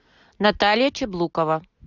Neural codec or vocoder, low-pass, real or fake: none; 7.2 kHz; real